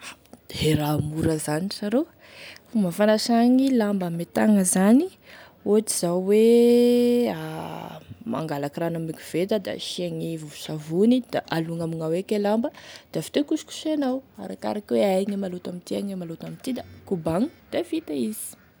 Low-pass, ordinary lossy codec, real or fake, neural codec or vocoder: none; none; real; none